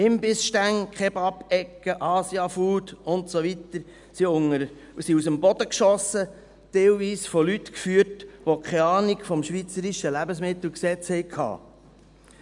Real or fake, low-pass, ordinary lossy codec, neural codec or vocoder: real; 10.8 kHz; none; none